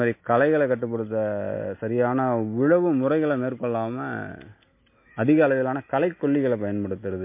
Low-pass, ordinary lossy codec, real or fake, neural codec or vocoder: 3.6 kHz; MP3, 24 kbps; real; none